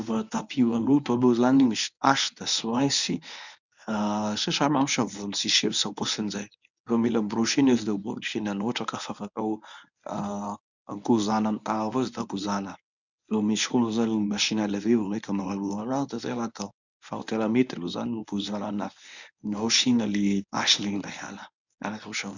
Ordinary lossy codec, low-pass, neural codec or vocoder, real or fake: none; 7.2 kHz; codec, 24 kHz, 0.9 kbps, WavTokenizer, medium speech release version 1; fake